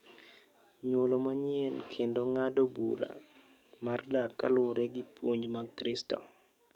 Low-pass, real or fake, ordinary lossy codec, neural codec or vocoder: 19.8 kHz; fake; none; codec, 44.1 kHz, 7.8 kbps, DAC